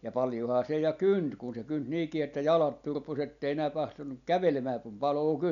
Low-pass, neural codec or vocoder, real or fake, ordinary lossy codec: 7.2 kHz; none; real; none